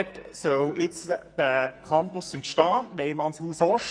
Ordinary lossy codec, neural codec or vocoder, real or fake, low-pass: Opus, 64 kbps; codec, 44.1 kHz, 2.6 kbps, SNAC; fake; 9.9 kHz